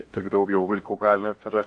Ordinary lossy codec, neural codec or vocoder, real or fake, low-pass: AAC, 48 kbps; codec, 16 kHz in and 24 kHz out, 0.8 kbps, FocalCodec, streaming, 65536 codes; fake; 9.9 kHz